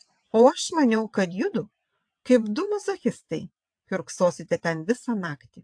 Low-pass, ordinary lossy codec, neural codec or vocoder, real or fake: 9.9 kHz; AAC, 64 kbps; vocoder, 22.05 kHz, 80 mel bands, WaveNeXt; fake